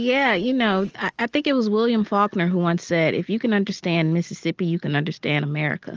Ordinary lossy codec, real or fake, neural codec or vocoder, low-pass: Opus, 24 kbps; real; none; 7.2 kHz